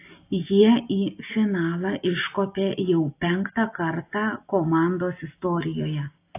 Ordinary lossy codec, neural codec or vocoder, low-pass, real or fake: AAC, 24 kbps; none; 3.6 kHz; real